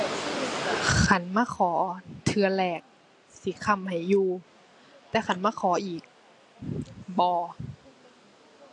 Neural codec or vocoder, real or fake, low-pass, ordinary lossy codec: none; real; 10.8 kHz; AAC, 48 kbps